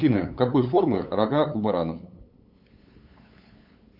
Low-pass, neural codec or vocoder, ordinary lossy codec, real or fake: 5.4 kHz; codec, 16 kHz, 8 kbps, FunCodec, trained on LibriTTS, 25 frames a second; AAC, 48 kbps; fake